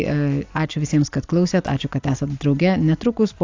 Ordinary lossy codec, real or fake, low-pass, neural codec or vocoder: AAC, 48 kbps; real; 7.2 kHz; none